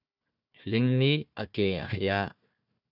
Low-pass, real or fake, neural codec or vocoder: 5.4 kHz; fake; codec, 16 kHz, 1 kbps, FunCodec, trained on Chinese and English, 50 frames a second